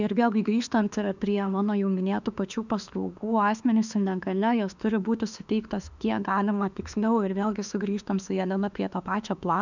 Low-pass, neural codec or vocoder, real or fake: 7.2 kHz; autoencoder, 48 kHz, 32 numbers a frame, DAC-VAE, trained on Japanese speech; fake